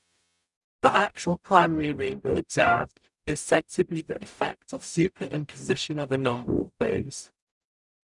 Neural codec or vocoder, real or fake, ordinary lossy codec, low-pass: codec, 44.1 kHz, 0.9 kbps, DAC; fake; none; 10.8 kHz